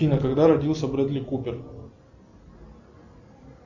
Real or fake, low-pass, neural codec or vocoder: real; 7.2 kHz; none